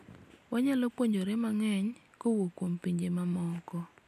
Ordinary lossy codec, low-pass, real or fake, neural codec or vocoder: none; 14.4 kHz; real; none